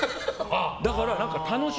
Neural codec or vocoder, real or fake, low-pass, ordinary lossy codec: none; real; none; none